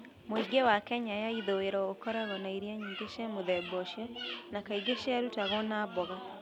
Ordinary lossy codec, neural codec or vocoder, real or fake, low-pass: none; none; real; 19.8 kHz